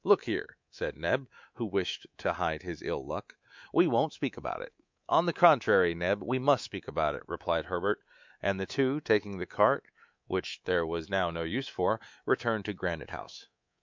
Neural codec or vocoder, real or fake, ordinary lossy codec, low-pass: codec, 24 kHz, 3.1 kbps, DualCodec; fake; MP3, 64 kbps; 7.2 kHz